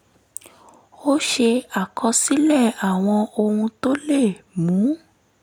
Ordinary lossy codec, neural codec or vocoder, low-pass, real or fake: none; none; none; real